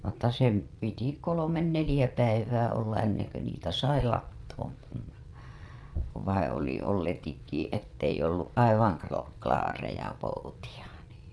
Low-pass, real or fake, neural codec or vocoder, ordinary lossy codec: none; fake; vocoder, 22.05 kHz, 80 mel bands, Vocos; none